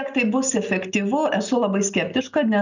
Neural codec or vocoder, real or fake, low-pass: none; real; 7.2 kHz